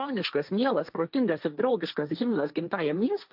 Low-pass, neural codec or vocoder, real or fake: 5.4 kHz; codec, 16 kHz, 1.1 kbps, Voila-Tokenizer; fake